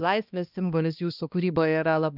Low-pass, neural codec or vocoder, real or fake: 5.4 kHz; codec, 16 kHz, 1 kbps, X-Codec, HuBERT features, trained on balanced general audio; fake